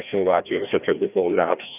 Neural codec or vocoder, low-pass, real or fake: codec, 16 kHz, 1 kbps, FreqCodec, larger model; 3.6 kHz; fake